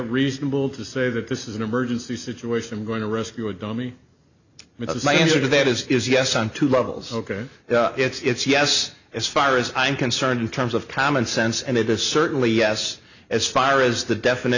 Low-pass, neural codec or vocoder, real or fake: 7.2 kHz; none; real